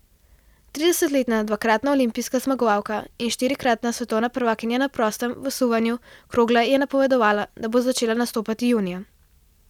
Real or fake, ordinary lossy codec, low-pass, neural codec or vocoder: real; none; 19.8 kHz; none